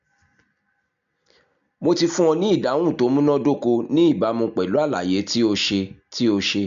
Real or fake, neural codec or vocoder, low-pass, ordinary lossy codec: real; none; 7.2 kHz; MP3, 64 kbps